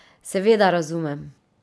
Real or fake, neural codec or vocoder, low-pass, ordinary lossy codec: real; none; none; none